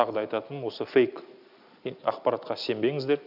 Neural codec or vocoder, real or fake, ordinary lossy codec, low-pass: none; real; none; 5.4 kHz